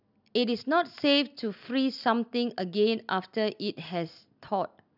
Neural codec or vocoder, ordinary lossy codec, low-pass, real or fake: none; none; 5.4 kHz; real